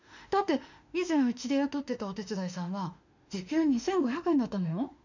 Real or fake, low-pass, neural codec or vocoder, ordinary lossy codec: fake; 7.2 kHz; autoencoder, 48 kHz, 32 numbers a frame, DAC-VAE, trained on Japanese speech; none